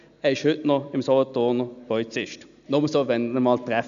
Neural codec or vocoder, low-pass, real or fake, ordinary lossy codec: none; 7.2 kHz; real; none